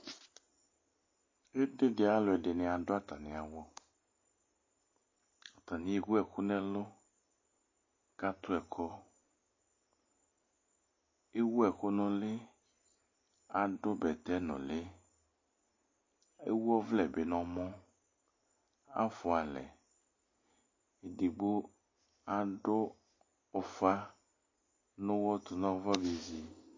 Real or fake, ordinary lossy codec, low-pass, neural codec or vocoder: real; MP3, 32 kbps; 7.2 kHz; none